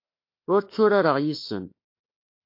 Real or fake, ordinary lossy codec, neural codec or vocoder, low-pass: fake; MP3, 32 kbps; autoencoder, 48 kHz, 32 numbers a frame, DAC-VAE, trained on Japanese speech; 5.4 kHz